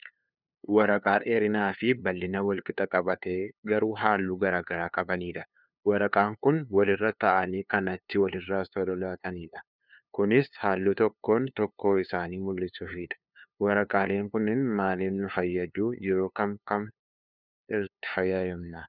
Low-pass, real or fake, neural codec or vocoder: 5.4 kHz; fake; codec, 16 kHz, 2 kbps, FunCodec, trained on LibriTTS, 25 frames a second